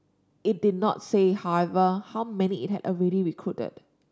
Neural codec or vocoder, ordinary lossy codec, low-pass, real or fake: none; none; none; real